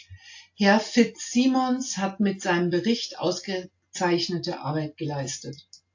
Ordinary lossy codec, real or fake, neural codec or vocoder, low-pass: AAC, 48 kbps; real; none; 7.2 kHz